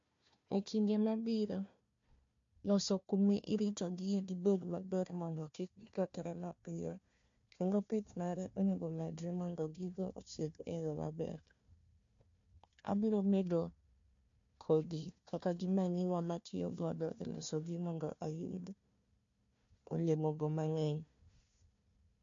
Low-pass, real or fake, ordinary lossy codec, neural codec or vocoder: 7.2 kHz; fake; MP3, 48 kbps; codec, 16 kHz, 1 kbps, FunCodec, trained on Chinese and English, 50 frames a second